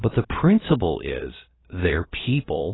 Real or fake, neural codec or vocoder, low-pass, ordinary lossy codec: fake; codec, 16 kHz, about 1 kbps, DyCAST, with the encoder's durations; 7.2 kHz; AAC, 16 kbps